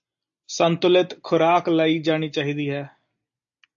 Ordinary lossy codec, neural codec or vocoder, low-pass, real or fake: AAC, 64 kbps; none; 7.2 kHz; real